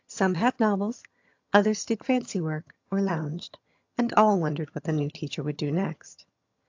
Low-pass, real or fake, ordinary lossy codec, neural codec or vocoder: 7.2 kHz; fake; AAC, 48 kbps; vocoder, 22.05 kHz, 80 mel bands, HiFi-GAN